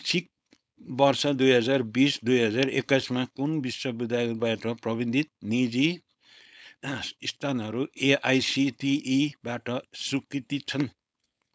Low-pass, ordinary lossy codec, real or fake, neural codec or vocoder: none; none; fake; codec, 16 kHz, 4.8 kbps, FACodec